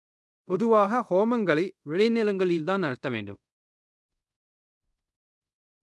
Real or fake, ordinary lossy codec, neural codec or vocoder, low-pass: fake; none; codec, 24 kHz, 0.5 kbps, DualCodec; none